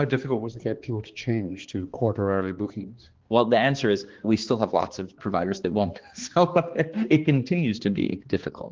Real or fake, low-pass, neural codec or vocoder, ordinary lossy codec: fake; 7.2 kHz; codec, 16 kHz, 2 kbps, X-Codec, HuBERT features, trained on balanced general audio; Opus, 16 kbps